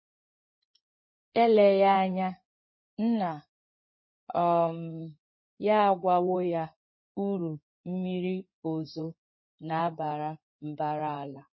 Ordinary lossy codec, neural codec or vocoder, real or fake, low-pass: MP3, 24 kbps; codec, 16 kHz in and 24 kHz out, 2.2 kbps, FireRedTTS-2 codec; fake; 7.2 kHz